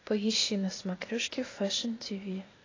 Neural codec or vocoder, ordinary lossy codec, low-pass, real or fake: codec, 16 kHz, 0.8 kbps, ZipCodec; AAC, 32 kbps; 7.2 kHz; fake